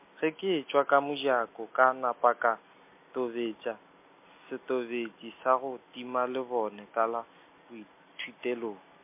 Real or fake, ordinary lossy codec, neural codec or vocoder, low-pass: real; MP3, 24 kbps; none; 3.6 kHz